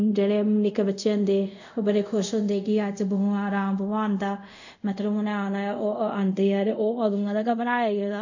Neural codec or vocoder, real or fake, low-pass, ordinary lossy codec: codec, 24 kHz, 0.5 kbps, DualCodec; fake; 7.2 kHz; none